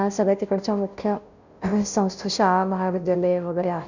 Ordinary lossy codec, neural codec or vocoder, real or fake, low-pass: none; codec, 16 kHz, 0.5 kbps, FunCodec, trained on Chinese and English, 25 frames a second; fake; 7.2 kHz